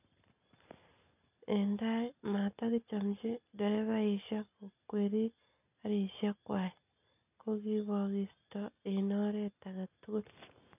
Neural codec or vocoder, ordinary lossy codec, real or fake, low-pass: none; none; real; 3.6 kHz